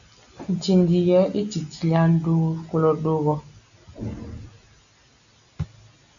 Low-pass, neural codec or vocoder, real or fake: 7.2 kHz; none; real